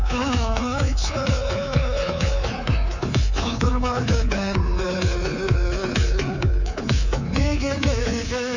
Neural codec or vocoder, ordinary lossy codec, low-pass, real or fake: codec, 24 kHz, 3.1 kbps, DualCodec; none; 7.2 kHz; fake